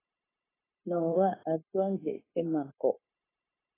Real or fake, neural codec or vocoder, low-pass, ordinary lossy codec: fake; codec, 16 kHz, 0.9 kbps, LongCat-Audio-Codec; 3.6 kHz; AAC, 16 kbps